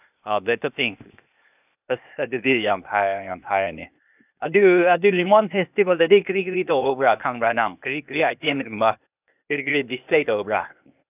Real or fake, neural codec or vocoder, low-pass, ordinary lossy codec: fake; codec, 16 kHz, 0.8 kbps, ZipCodec; 3.6 kHz; none